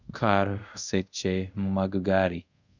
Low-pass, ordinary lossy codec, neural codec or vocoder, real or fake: 7.2 kHz; none; codec, 24 kHz, 0.5 kbps, DualCodec; fake